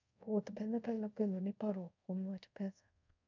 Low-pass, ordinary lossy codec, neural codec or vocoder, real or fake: 7.2 kHz; none; codec, 24 kHz, 0.5 kbps, DualCodec; fake